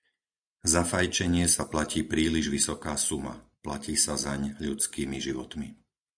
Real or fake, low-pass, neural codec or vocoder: real; 9.9 kHz; none